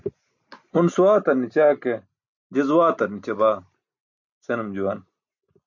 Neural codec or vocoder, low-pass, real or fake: none; 7.2 kHz; real